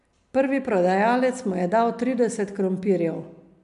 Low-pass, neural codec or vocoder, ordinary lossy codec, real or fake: 10.8 kHz; none; MP3, 64 kbps; real